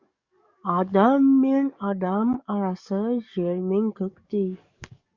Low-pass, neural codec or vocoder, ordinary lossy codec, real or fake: 7.2 kHz; codec, 44.1 kHz, 7.8 kbps, DAC; Opus, 64 kbps; fake